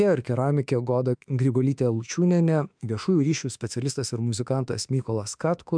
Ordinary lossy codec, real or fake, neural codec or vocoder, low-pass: MP3, 96 kbps; fake; autoencoder, 48 kHz, 32 numbers a frame, DAC-VAE, trained on Japanese speech; 9.9 kHz